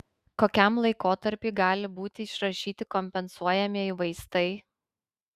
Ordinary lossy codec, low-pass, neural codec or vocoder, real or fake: Opus, 64 kbps; 14.4 kHz; autoencoder, 48 kHz, 128 numbers a frame, DAC-VAE, trained on Japanese speech; fake